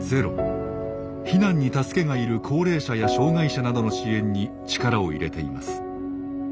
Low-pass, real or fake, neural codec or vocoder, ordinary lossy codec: none; real; none; none